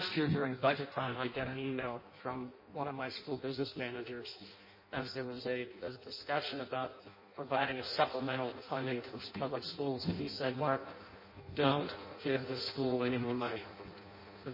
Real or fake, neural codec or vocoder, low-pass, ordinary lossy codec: fake; codec, 16 kHz in and 24 kHz out, 0.6 kbps, FireRedTTS-2 codec; 5.4 kHz; MP3, 24 kbps